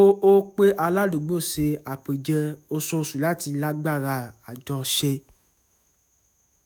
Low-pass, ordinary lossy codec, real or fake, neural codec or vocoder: none; none; fake; autoencoder, 48 kHz, 128 numbers a frame, DAC-VAE, trained on Japanese speech